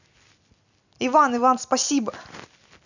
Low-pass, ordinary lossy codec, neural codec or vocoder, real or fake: 7.2 kHz; none; none; real